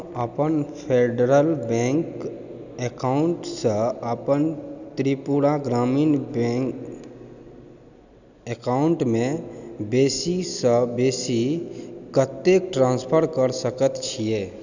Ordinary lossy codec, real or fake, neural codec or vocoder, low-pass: none; real; none; 7.2 kHz